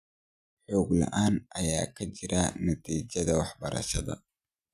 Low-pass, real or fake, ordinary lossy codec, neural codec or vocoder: 14.4 kHz; real; none; none